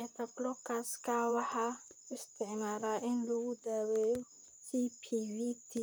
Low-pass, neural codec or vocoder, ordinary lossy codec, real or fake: none; vocoder, 44.1 kHz, 128 mel bands, Pupu-Vocoder; none; fake